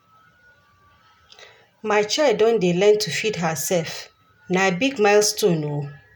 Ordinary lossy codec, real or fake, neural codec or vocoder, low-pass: none; real; none; none